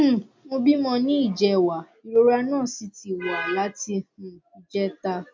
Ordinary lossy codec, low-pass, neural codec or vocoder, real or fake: MP3, 64 kbps; 7.2 kHz; none; real